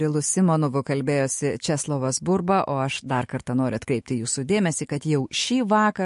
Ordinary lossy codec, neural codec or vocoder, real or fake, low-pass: MP3, 48 kbps; autoencoder, 48 kHz, 128 numbers a frame, DAC-VAE, trained on Japanese speech; fake; 14.4 kHz